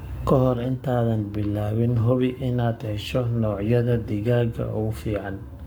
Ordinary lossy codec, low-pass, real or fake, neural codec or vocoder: none; none; fake; codec, 44.1 kHz, 7.8 kbps, Pupu-Codec